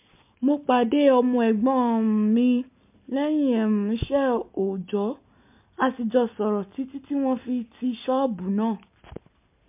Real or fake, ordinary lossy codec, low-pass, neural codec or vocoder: real; MP3, 32 kbps; 3.6 kHz; none